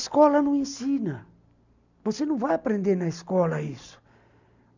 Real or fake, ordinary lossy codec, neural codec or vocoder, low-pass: real; none; none; 7.2 kHz